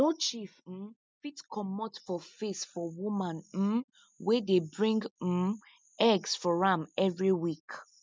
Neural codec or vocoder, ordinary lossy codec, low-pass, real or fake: none; none; none; real